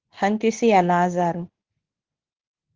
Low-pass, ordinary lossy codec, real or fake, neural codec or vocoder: 7.2 kHz; Opus, 16 kbps; fake; codec, 24 kHz, 0.9 kbps, WavTokenizer, medium speech release version 2